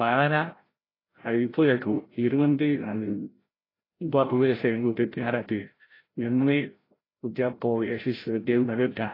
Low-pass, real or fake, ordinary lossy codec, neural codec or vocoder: 5.4 kHz; fake; AAC, 24 kbps; codec, 16 kHz, 0.5 kbps, FreqCodec, larger model